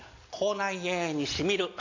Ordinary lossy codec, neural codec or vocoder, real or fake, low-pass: AAC, 48 kbps; none; real; 7.2 kHz